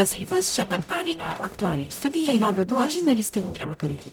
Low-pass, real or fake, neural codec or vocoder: 19.8 kHz; fake; codec, 44.1 kHz, 0.9 kbps, DAC